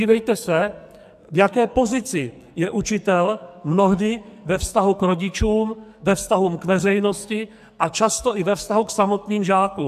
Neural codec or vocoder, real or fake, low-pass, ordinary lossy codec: codec, 44.1 kHz, 2.6 kbps, SNAC; fake; 14.4 kHz; AAC, 96 kbps